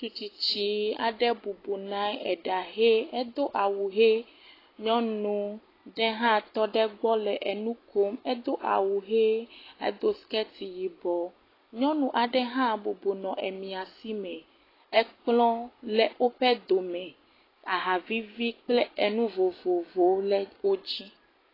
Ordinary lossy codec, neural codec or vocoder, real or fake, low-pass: AAC, 24 kbps; none; real; 5.4 kHz